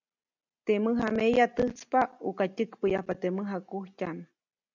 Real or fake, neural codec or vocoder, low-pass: real; none; 7.2 kHz